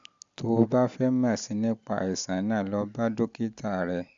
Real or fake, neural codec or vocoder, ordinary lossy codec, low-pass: real; none; MP3, 64 kbps; 7.2 kHz